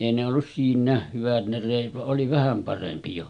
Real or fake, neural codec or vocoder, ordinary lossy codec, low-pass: real; none; none; 9.9 kHz